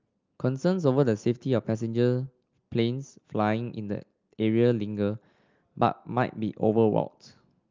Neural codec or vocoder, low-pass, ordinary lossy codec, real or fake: none; 7.2 kHz; Opus, 24 kbps; real